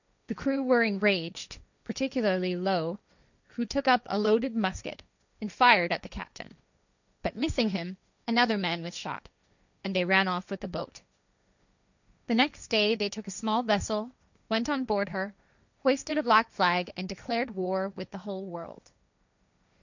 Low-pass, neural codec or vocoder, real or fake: 7.2 kHz; codec, 16 kHz, 1.1 kbps, Voila-Tokenizer; fake